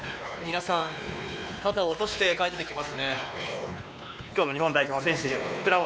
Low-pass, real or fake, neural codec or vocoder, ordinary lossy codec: none; fake; codec, 16 kHz, 2 kbps, X-Codec, WavLM features, trained on Multilingual LibriSpeech; none